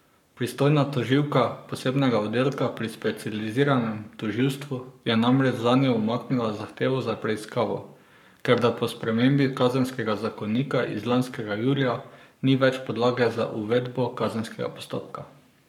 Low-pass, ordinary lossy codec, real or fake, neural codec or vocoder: 19.8 kHz; none; fake; codec, 44.1 kHz, 7.8 kbps, Pupu-Codec